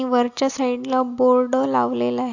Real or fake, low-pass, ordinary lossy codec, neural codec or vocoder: real; 7.2 kHz; AAC, 48 kbps; none